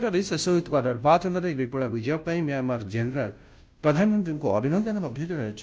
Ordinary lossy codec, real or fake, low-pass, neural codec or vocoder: none; fake; none; codec, 16 kHz, 0.5 kbps, FunCodec, trained on Chinese and English, 25 frames a second